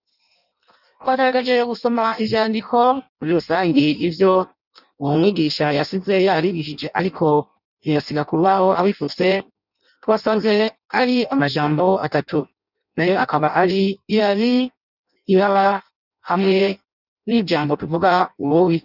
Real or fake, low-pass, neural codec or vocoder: fake; 5.4 kHz; codec, 16 kHz in and 24 kHz out, 0.6 kbps, FireRedTTS-2 codec